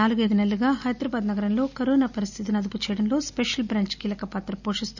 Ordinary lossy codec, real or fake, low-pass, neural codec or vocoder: none; real; 7.2 kHz; none